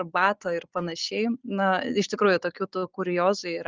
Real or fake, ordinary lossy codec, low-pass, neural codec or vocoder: real; Opus, 24 kbps; 7.2 kHz; none